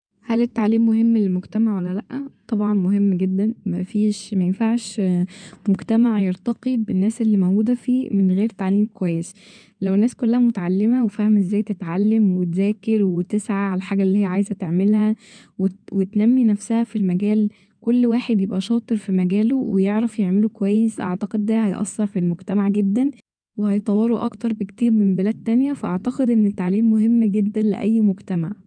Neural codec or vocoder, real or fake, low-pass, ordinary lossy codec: codec, 16 kHz in and 24 kHz out, 2.2 kbps, FireRedTTS-2 codec; fake; 9.9 kHz; none